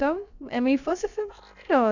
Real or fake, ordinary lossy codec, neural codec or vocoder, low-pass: fake; none; codec, 16 kHz, about 1 kbps, DyCAST, with the encoder's durations; 7.2 kHz